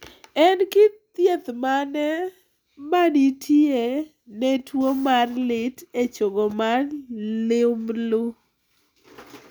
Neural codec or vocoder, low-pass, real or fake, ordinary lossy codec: none; none; real; none